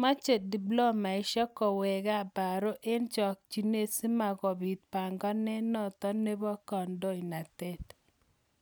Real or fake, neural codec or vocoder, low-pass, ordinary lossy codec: real; none; none; none